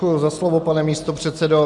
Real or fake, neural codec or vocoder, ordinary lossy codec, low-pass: real; none; MP3, 96 kbps; 10.8 kHz